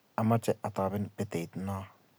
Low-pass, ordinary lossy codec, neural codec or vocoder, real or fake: none; none; none; real